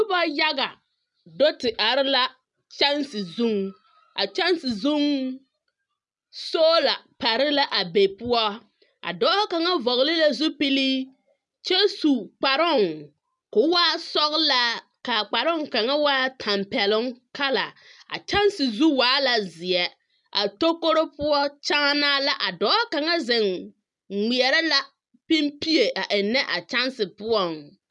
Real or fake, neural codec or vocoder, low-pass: real; none; 10.8 kHz